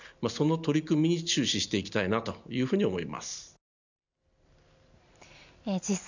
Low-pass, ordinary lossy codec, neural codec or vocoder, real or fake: 7.2 kHz; none; none; real